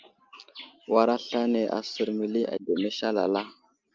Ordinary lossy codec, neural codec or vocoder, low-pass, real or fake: Opus, 24 kbps; none; 7.2 kHz; real